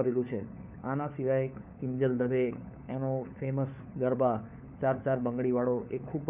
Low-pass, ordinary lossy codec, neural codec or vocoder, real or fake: 3.6 kHz; none; codec, 16 kHz, 4 kbps, FunCodec, trained on LibriTTS, 50 frames a second; fake